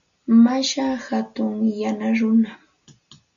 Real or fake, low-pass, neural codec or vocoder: real; 7.2 kHz; none